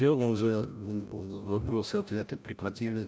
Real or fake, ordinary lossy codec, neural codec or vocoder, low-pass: fake; none; codec, 16 kHz, 0.5 kbps, FreqCodec, larger model; none